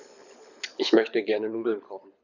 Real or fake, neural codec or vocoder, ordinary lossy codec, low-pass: fake; codec, 24 kHz, 6 kbps, HILCodec; none; 7.2 kHz